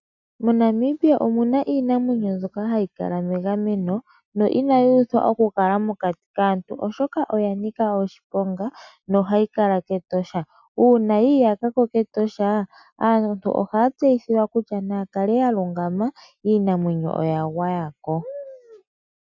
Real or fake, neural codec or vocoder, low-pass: real; none; 7.2 kHz